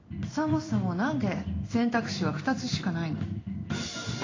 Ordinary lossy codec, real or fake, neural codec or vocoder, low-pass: AAC, 32 kbps; fake; codec, 16 kHz in and 24 kHz out, 1 kbps, XY-Tokenizer; 7.2 kHz